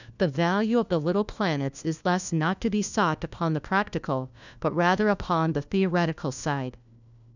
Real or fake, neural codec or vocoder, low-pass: fake; codec, 16 kHz, 1 kbps, FunCodec, trained on LibriTTS, 50 frames a second; 7.2 kHz